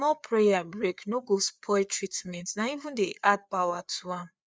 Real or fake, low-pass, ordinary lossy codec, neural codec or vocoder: fake; none; none; codec, 16 kHz, 4 kbps, FreqCodec, larger model